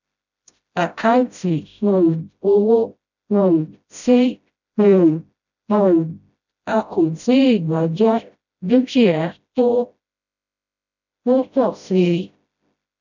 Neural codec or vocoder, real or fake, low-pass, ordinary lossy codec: codec, 16 kHz, 0.5 kbps, FreqCodec, smaller model; fake; 7.2 kHz; none